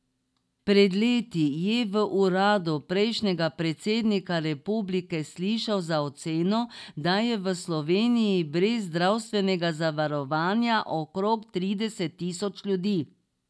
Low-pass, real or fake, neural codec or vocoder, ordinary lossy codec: none; real; none; none